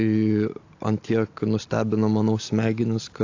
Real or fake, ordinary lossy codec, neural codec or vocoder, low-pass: real; AAC, 48 kbps; none; 7.2 kHz